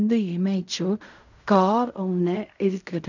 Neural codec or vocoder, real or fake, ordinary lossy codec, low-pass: codec, 16 kHz in and 24 kHz out, 0.4 kbps, LongCat-Audio-Codec, fine tuned four codebook decoder; fake; none; 7.2 kHz